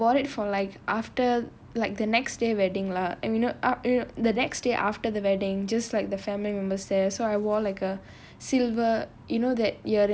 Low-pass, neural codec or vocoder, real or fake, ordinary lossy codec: none; none; real; none